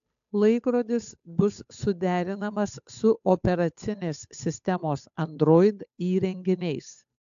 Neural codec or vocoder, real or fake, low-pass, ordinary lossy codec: codec, 16 kHz, 8 kbps, FunCodec, trained on Chinese and English, 25 frames a second; fake; 7.2 kHz; AAC, 64 kbps